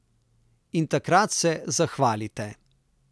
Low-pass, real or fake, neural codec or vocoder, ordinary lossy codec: none; real; none; none